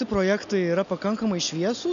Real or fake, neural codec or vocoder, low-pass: real; none; 7.2 kHz